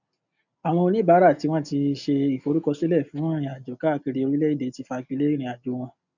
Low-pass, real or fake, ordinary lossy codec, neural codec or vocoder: 7.2 kHz; real; none; none